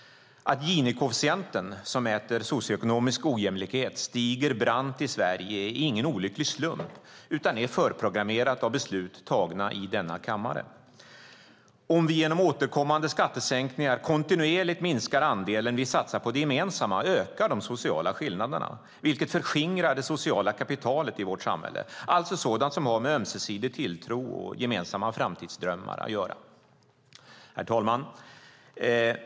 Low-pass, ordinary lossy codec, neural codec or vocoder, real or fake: none; none; none; real